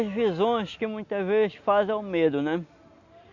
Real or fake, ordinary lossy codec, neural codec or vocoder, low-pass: real; Opus, 64 kbps; none; 7.2 kHz